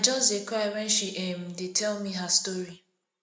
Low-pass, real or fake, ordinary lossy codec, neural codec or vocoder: none; real; none; none